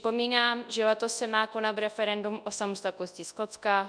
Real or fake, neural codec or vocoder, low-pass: fake; codec, 24 kHz, 0.9 kbps, WavTokenizer, large speech release; 9.9 kHz